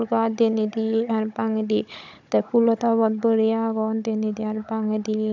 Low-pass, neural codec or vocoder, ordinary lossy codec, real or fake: 7.2 kHz; codec, 16 kHz, 16 kbps, FunCodec, trained on Chinese and English, 50 frames a second; none; fake